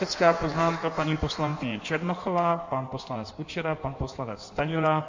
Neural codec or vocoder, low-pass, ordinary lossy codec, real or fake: codec, 16 kHz in and 24 kHz out, 1.1 kbps, FireRedTTS-2 codec; 7.2 kHz; MP3, 64 kbps; fake